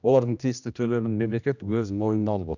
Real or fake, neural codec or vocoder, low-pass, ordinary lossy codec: fake; codec, 16 kHz, 1 kbps, X-Codec, HuBERT features, trained on general audio; 7.2 kHz; none